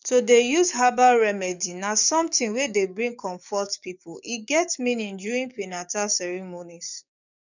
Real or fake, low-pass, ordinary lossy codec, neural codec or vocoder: fake; 7.2 kHz; none; codec, 44.1 kHz, 7.8 kbps, DAC